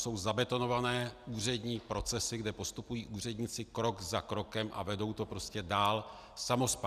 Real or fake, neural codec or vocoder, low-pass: real; none; 14.4 kHz